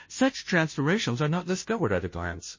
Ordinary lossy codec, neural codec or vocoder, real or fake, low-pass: MP3, 32 kbps; codec, 16 kHz, 0.5 kbps, FunCodec, trained on Chinese and English, 25 frames a second; fake; 7.2 kHz